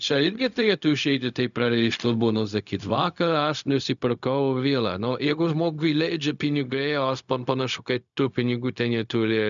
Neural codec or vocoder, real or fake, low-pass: codec, 16 kHz, 0.4 kbps, LongCat-Audio-Codec; fake; 7.2 kHz